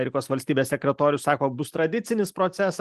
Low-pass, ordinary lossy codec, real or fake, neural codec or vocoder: 14.4 kHz; Opus, 24 kbps; real; none